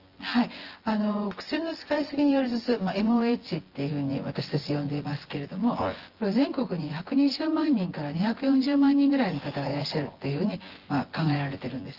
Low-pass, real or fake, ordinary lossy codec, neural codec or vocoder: 5.4 kHz; fake; Opus, 16 kbps; vocoder, 24 kHz, 100 mel bands, Vocos